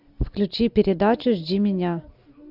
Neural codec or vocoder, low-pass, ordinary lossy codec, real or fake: none; 5.4 kHz; AAC, 48 kbps; real